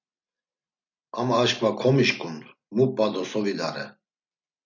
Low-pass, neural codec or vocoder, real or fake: 7.2 kHz; none; real